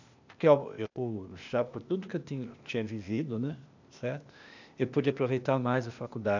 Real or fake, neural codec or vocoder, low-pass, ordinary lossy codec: fake; codec, 16 kHz, 0.8 kbps, ZipCodec; 7.2 kHz; none